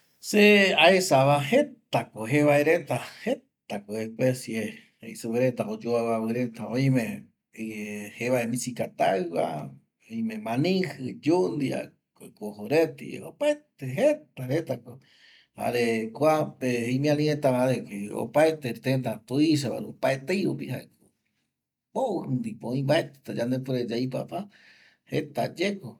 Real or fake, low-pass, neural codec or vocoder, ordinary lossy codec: real; 19.8 kHz; none; none